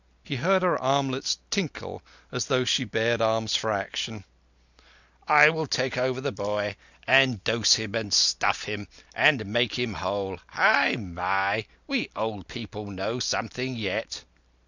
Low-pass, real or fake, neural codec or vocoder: 7.2 kHz; real; none